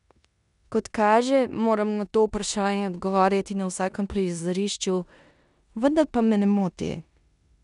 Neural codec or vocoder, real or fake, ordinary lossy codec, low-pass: codec, 16 kHz in and 24 kHz out, 0.9 kbps, LongCat-Audio-Codec, four codebook decoder; fake; none; 10.8 kHz